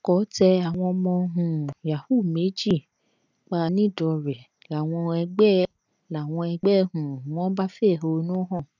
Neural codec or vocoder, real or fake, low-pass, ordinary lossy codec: none; real; 7.2 kHz; none